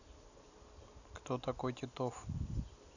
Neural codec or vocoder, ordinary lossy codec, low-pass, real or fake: none; none; 7.2 kHz; real